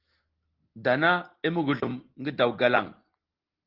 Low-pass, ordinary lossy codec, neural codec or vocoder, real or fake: 5.4 kHz; Opus, 16 kbps; none; real